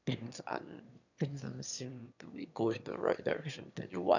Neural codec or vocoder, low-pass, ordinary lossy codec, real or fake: autoencoder, 22.05 kHz, a latent of 192 numbers a frame, VITS, trained on one speaker; 7.2 kHz; none; fake